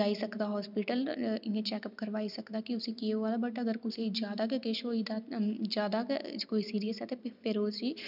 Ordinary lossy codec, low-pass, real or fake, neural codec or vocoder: none; 5.4 kHz; real; none